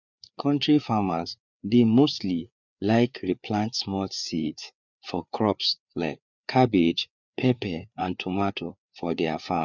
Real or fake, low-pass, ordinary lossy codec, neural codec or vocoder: fake; 7.2 kHz; none; codec, 16 kHz, 4 kbps, FreqCodec, larger model